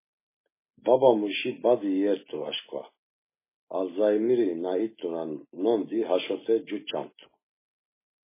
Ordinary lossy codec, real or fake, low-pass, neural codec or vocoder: MP3, 16 kbps; real; 3.6 kHz; none